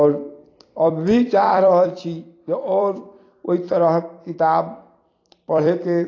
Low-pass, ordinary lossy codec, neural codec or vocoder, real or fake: 7.2 kHz; AAC, 32 kbps; vocoder, 44.1 kHz, 128 mel bands every 256 samples, BigVGAN v2; fake